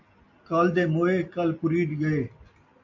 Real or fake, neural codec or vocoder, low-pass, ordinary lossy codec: real; none; 7.2 kHz; MP3, 48 kbps